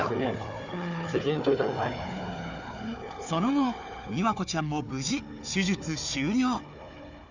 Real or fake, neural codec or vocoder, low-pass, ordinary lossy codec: fake; codec, 16 kHz, 4 kbps, FunCodec, trained on Chinese and English, 50 frames a second; 7.2 kHz; none